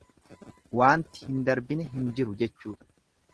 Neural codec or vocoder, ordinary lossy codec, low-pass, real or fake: none; Opus, 16 kbps; 10.8 kHz; real